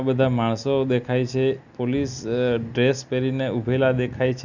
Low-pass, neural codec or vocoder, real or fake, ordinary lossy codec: 7.2 kHz; none; real; none